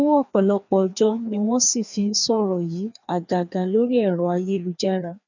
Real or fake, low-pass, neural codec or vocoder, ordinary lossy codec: fake; 7.2 kHz; codec, 16 kHz, 2 kbps, FreqCodec, larger model; none